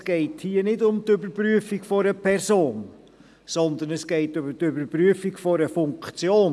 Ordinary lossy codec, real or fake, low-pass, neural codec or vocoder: none; real; none; none